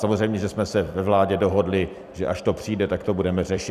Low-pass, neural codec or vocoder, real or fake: 14.4 kHz; vocoder, 44.1 kHz, 128 mel bands every 256 samples, BigVGAN v2; fake